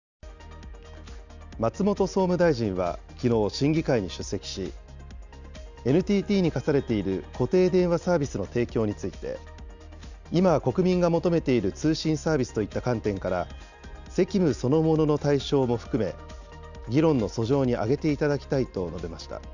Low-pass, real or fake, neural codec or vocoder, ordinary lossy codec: 7.2 kHz; real; none; none